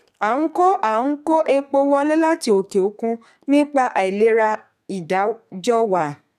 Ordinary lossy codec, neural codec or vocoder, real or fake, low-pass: none; codec, 32 kHz, 1.9 kbps, SNAC; fake; 14.4 kHz